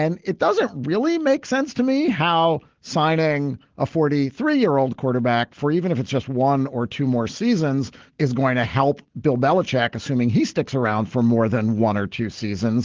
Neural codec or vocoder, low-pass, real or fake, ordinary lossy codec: none; 7.2 kHz; real; Opus, 16 kbps